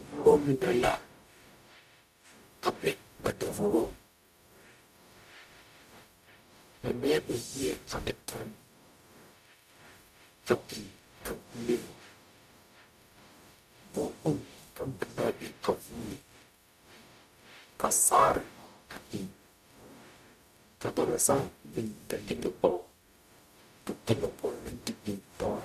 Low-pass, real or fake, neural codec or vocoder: 14.4 kHz; fake; codec, 44.1 kHz, 0.9 kbps, DAC